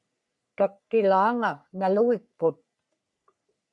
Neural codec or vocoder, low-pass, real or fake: codec, 44.1 kHz, 3.4 kbps, Pupu-Codec; 10.8 kHz; fake